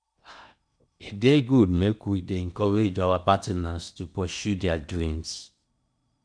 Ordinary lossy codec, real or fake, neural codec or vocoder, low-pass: none; fake; codec, 16 kHz in and 24 kHz out, 0.8 kbps, FocalCodec, streaming, 65536 codes; 9.9 kHz